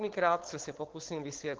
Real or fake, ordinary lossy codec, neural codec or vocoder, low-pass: fake; Opus, 16 kbps; codec, 16 kHz, 4.8 kbps, FACodec; 7.2 kHz